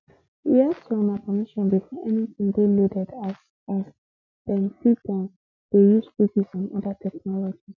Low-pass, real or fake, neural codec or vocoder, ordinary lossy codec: 7.2 kHz; real; none; MP3, 64 kbps